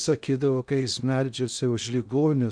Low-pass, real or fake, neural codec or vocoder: 9.9 kHz; fake; codec, 16 kHz in and 24 kHz out, 0.6 kbps, FocalCodec, streaming, 2048 codes